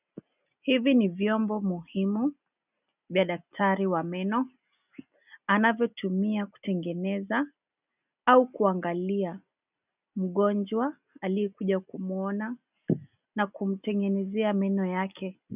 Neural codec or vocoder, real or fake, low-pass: none; real; 3.6 kHz